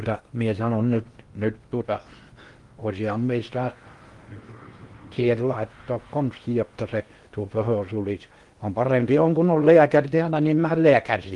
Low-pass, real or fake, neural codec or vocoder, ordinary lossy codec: 10.8 kHz; fake; codec, 16 kHz in and 24 kHz out, 0.8 kbps, FocalCodec, streaming, 65536 codes; Opus, 24 kbps